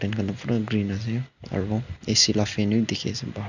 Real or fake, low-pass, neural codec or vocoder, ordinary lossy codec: real; 7.2 kHz; none; none